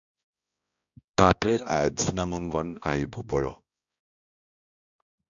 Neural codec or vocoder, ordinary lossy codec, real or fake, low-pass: codec, 16 kHz, 1 kbps, X-Codec, HuBERT features, trained on balanced general audio; AAC, 64 kbps; fake; 7.2 kHz